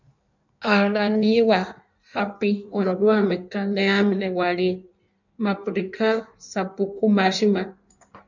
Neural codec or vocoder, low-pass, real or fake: codec, 16 kHz in and 24 kHz out, 1.1 kbps, FireRedTTS-2 codec; 7.2 kHz; fake